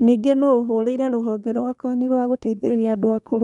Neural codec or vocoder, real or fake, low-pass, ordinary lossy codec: codec, 24 kHz, 1 kbps, SNAC; fake; 10.8 kHz; none